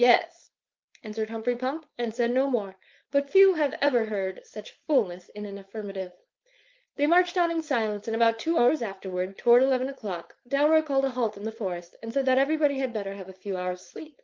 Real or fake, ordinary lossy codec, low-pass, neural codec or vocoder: fake; Opus, 24 kbps; 7.2 kHz; codec, 16 kHz, 4.8 kbps, FACodec